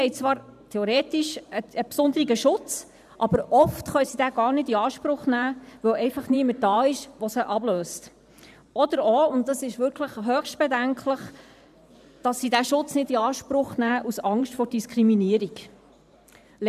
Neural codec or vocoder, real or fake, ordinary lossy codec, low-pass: vocoder, 44.1 kHz, 128 mel bands every 512 samples, BigVGAN v2; fake; none; 14.4 kHz